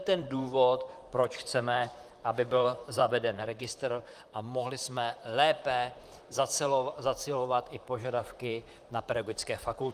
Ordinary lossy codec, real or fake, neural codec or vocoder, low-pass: Opus, 32 kbps; fake; vocoder, 44.1 kHz, 128 mel bands, Pupu-Vocoder; 14.4 kHz